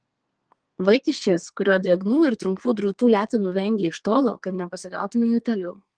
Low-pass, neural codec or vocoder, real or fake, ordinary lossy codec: 9.9 kHz; codec, 32 kHz, 1.9 kbps, SNAC; fake; Opus, 24 kbps